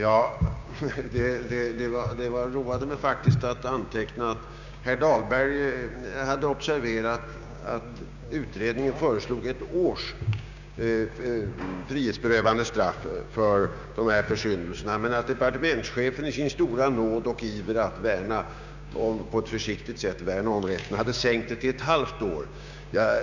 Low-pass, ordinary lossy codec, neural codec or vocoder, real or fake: 7.2 kHz; none; codec, 16 kHz, 6 kbps, DAC; fake